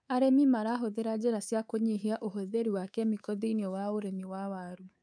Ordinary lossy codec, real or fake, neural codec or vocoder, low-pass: none; fake; codec, 24 kHz, 3.1 kbps, DualCodec; 9.9 kHz